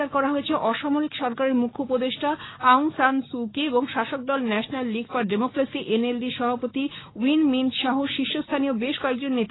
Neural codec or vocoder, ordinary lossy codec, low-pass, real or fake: codec, 16 kHz, 6 kbps, DAC; AAC, 16 kbps; 7.2 kHz; fake